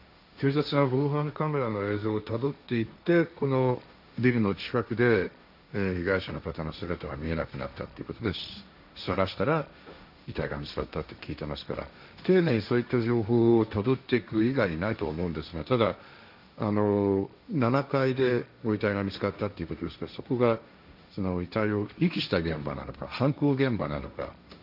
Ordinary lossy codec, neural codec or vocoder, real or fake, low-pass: none; codec, 16 kHz, 1.1 kbps, Voila-Tokenizer; fake; 5.4 kHz